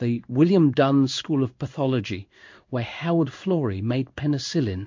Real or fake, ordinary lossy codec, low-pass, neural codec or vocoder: fake; MP3, 48 kbps; 7.2 kHz; codec, 16 kHz in and 24 kHz out, 1 kbps, XY-Tokenizer